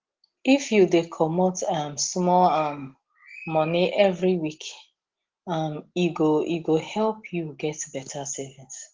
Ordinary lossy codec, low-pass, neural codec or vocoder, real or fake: Opus, 16 kbps; 7.2 kHz; none; real